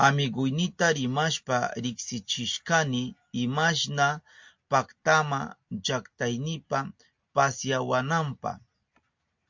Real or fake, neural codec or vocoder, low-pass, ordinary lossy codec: real; none; 7.2 kHz; MP3, 48 kbps